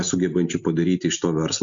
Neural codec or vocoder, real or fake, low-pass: none; real; 7.2 kHz